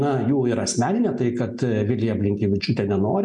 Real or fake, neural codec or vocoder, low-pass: real; none; 10.8 kHz